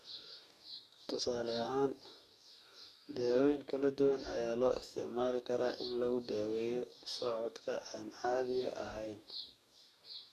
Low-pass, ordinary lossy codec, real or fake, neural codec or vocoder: 14.4 kHz; none; fake; codec, 44.1 kHz, 2.6 kbps, DAC